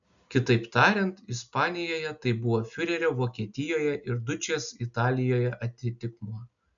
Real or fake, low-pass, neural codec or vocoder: real; 7.2 kHz; none